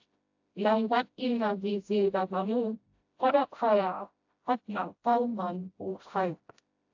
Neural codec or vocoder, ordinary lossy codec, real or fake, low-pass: codec, 16 kHz, 0.5 kbps, FreqCodec, smaller model; AAC, 48 kbps; fake; 7.2 kHz